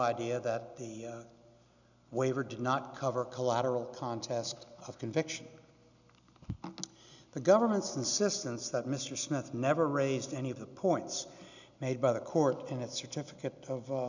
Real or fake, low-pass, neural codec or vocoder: real; 7.2 kHz; none